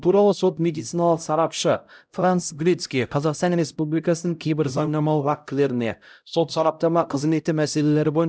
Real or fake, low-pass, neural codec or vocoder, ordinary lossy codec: fake; none; codec, 16 kHz, 0.5 kbps, X-Codec, HuBERT features, trained on LibriSpeech; none